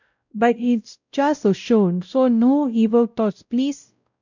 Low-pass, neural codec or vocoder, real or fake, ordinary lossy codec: 7.2 kHz; codec, 16 kHz, 0.5 kbps, X-Codec, WavLM features, trained on Multilingual LibriSpeech; fake; none